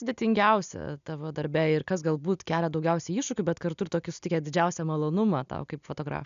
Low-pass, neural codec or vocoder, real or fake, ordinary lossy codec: 7.2 kHz; none; real; MP3, 96 kbps